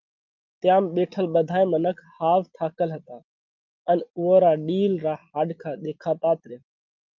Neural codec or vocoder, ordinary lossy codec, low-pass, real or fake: none; Opus, 24 kbps; 7.2 kHz; real